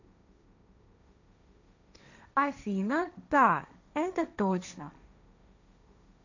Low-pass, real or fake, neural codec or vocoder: 7.2 kHz; fake; codec, 16 kHz, 1.1 kbps, Voila-Tokenizer